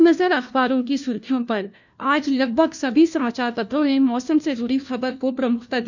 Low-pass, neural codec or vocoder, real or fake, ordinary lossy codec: 7.2 kHz; codec, 16 kHz, 1 kbps, FunCodec, trained on LibriTTS, 50 frames a second; fake; none